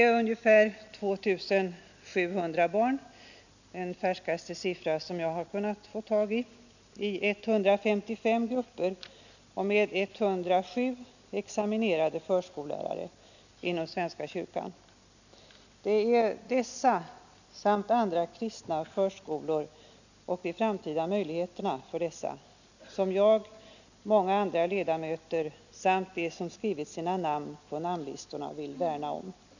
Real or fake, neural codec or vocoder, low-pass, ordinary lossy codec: real; none; 7.2 kHz; none